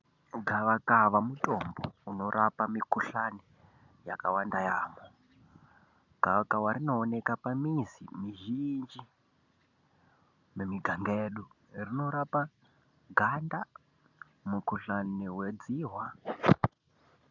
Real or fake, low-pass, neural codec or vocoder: real; 7.2 kHz; none